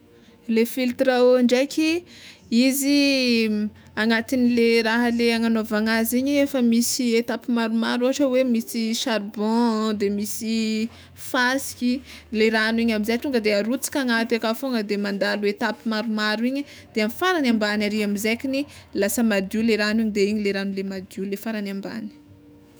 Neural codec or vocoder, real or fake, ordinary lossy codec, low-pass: autoencoder, 48 kHz, 128 numbers a frame, DAC-VAE, trained on Japanese speech; fake; none; none